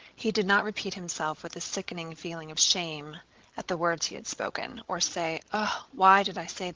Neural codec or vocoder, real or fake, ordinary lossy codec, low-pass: codec, 16 kHz, 16 kbps, FreqCodec, larger model; fake; Opus, 16 kbps; 7.2 kHz